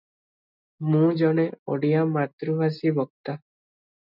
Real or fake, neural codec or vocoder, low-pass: real; none; 5.4 kHz